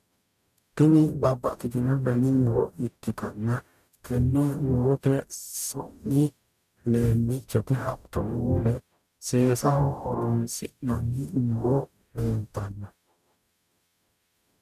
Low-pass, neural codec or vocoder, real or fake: 14.4 kHz; codec, 44.1 kHz, 0.9 kbps, DAC; fake